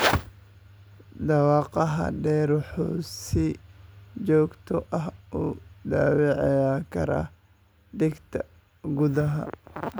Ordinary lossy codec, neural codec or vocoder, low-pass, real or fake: none; none; none; real